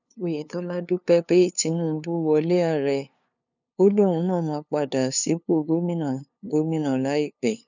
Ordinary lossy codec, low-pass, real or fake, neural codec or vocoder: none; 7.2 kHz; fake; codec, 16 kHz, 2 kbps, FunCodec, trained on LibriTTS, 25 frames a second